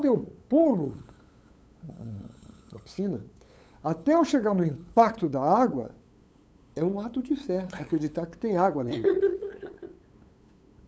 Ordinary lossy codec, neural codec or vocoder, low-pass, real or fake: none; codec, 16 kHz, 8 kbps, FunCodec, trained on LibriTTS, 25 frames a second; none; fake